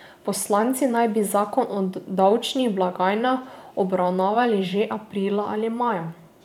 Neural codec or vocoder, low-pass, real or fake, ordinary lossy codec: vocoder, 44.1 kHz, 128 mel bands every 512 samples, BigVGAN v2; 19.8 kHz; fake; none